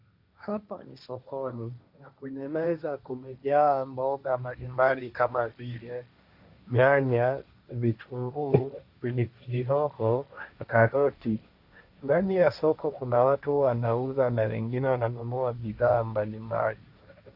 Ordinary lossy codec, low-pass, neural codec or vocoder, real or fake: Opus, 64 kbps; 5.4 kHz; codec, 16 kHz, 1.1 kbps, Voila-Tokenizer; fake